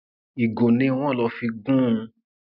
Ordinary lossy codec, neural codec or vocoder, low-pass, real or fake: none; none; 5.4 kHz; real